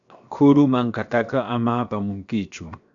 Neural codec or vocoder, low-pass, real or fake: codec, 16 kHz, 0.7 kbps, FocalCodec; 7.2 kHz; fake